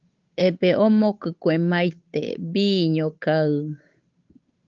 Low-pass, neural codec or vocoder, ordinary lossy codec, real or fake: 7.2 kHz; codec, 16 kHz, 8 kbps, FunCodec, trained on Chinese and English, 25 frames a second; Opus, 24 kbps; fake